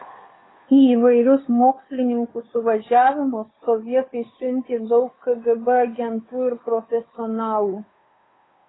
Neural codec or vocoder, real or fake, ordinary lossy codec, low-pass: codec, 16 kHz, 2 kbps, FunCodec, trained on Chinese and English, 25 frames a second; fake; AAC, 16 kbps; 7.2 kHz